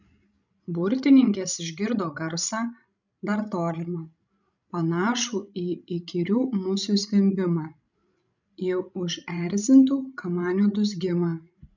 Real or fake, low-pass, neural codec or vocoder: fake; 7.2 kHz; codec, 16 kHz, 16 kbps, FreqCodec, larger model